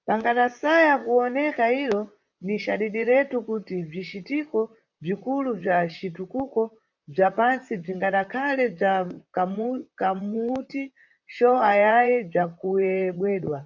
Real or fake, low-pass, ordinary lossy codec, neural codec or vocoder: fake; 7.2 kHz; MP3, 64 kbps; vocoder, 44.1 kHz, 128 mel bands, Pupu-Vocoder